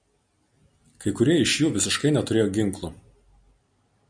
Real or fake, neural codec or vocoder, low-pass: real; none; 9.9 kHz